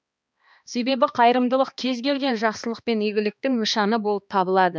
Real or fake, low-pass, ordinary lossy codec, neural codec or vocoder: fake; none; none; codec, 16 kHz, 2 kbps, X-Codec, HuBERT features, trained on balanced general audio